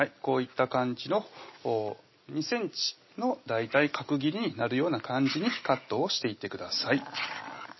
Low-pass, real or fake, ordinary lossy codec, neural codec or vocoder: 7.2 kHz; real; MP3, 24 kbps; none